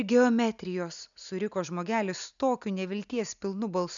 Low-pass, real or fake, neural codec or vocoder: 7.2 kHz; real; none